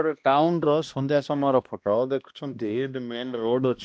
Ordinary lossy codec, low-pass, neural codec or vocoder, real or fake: none; none; codec, 16 kHz, 1 kbps, X-Codec, HuBERT features, trained on balanced general audio; fake